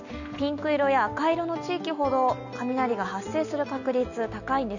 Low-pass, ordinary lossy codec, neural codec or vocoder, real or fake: 7.2 kHz; none; none; real